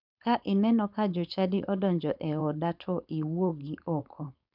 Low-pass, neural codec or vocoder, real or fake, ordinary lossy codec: 5.4 kHz; codec, 16 kHz, 4.8 kbps, FACodec; fake; none